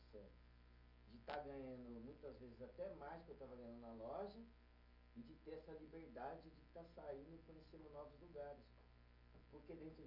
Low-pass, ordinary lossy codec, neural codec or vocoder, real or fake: 5.4 kHz; none; none; real